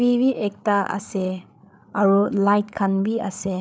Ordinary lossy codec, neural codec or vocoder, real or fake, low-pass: none; codec, 16 kHz, 8 kbps, FreqCodec, larger model; fake; none